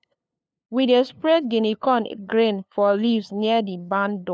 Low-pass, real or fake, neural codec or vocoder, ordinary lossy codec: none; fake; codec, 16 kHz, 2 kbps, FunCodec, trained on LibriTTS, 25 frames a second; none